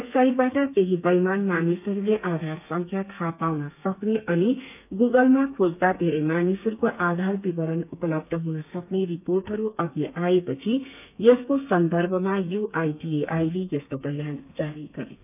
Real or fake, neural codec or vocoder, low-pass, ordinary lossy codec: fake; codec, 32 kHz, 1.9 kbps, SNAC; 3.6 kHz; none